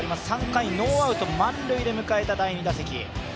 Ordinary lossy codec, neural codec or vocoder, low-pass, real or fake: none; none; none; real